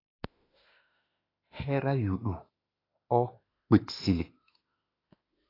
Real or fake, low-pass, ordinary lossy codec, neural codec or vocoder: fake; 5.4 kHz; AAC, 24 kbps; autoencoder, 48 kHz, 32 numbers a frame, DAC-VAE, trained on Japanese speech